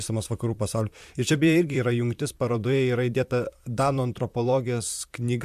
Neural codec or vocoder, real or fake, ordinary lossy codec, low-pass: vocoder, 44.1 kHz, 128 mel bands, Pupu-Vocoder; fake; MP3, 96 kbps; 14.4 kHz